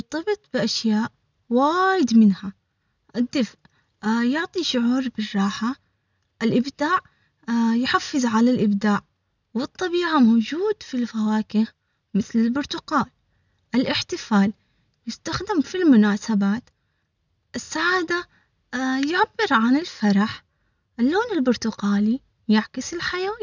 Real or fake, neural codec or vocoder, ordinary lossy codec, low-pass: real; none; none; 7.2 kHz